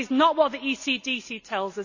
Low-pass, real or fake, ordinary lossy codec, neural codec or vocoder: 7.2 kHz; real; none; none